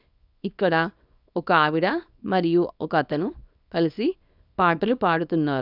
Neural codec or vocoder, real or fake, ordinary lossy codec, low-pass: codec, 24 kHz, 0.9 kbps, WavTokenizer, small release; fake; none; 5.4 kHz